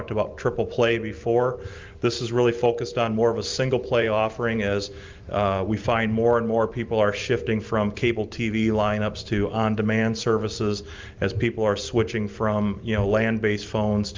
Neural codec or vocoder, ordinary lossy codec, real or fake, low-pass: vocoder, 44.1 kHz, 128 mel bands every 512 samples, BigVGAN v2; Opus, 24 kbps; fake; 7.2 kHz